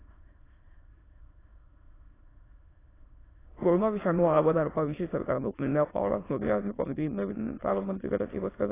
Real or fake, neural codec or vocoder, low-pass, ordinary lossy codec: fake; autoencoder, 22.05 kHz, a latent of 192 numbers a frame, VITS, trained on many speakers; 3.6 kHz; AAC, 16 kbps